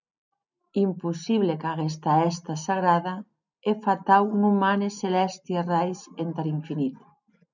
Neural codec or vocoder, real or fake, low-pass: none; real; 7.2 kHz